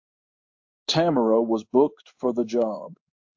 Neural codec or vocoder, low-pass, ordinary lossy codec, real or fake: codec, 16 kHz in and 24 kHz out, 1 kbps, XY-Tokenizer; 7.2 kHz; AAC, 48 kbps; fake